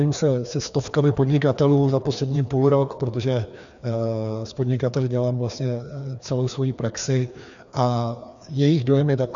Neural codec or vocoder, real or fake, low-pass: codec, 16 kHz, 2 kbps, FreqCodec, larger model; fake; 7.2 kHz